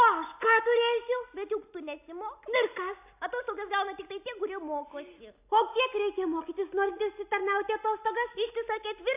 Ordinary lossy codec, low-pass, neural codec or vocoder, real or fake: AAC, 32 kbps; 3.6 kHz; none; real